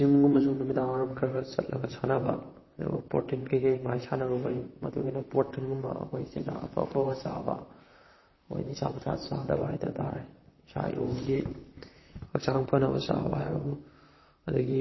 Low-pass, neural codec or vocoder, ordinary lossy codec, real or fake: 7.2 kHz; vocoder, 44.1 kHz, 128 mel bands, Pupu-Vocoder; MP3, 24 kbps; fake